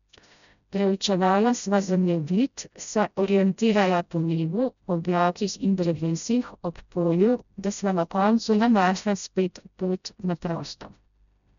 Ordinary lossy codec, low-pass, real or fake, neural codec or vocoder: none; 7.2 kHz; fake; codec, 16 kHz, 0.5 kbps, FreqCodec, smaller model